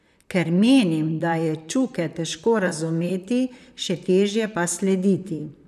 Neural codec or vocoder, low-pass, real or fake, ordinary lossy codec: vocoder, 44.1 kHz, 128 mel bands, Pupu-Vocoder; 14.4 kHz; fake; none